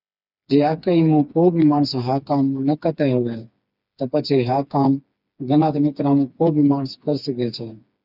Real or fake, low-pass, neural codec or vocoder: fake; 5.4 kHz; codec, 16 kHz, 4 kbps, FreqCodec, smaller model